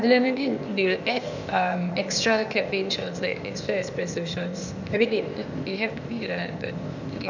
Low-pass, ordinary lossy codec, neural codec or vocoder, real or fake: 7.2 kHz; none; codec, 16 kHz, 0.8 kbps, ZipCodec; fake